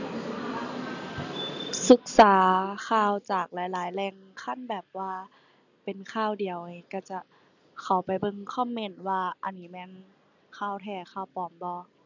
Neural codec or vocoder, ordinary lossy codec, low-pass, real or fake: none; none; 7.2 kHz; real